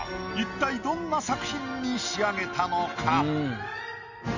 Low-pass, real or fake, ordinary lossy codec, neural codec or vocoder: 7.2 kHz; real; none; none